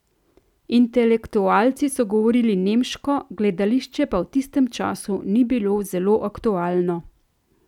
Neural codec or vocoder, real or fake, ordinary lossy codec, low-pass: none; real; none; 19.8 kHz